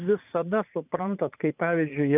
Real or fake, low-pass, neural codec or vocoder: fake; 3.6 kHz; vocoder, 44.1 kHz, 80 mel bands, Vocos